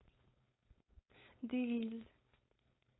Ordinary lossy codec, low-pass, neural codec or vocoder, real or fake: AAC, 16 kbps; 7.2 kHz; codec, 16 kHz, 4.8 kbps, FACodec; fake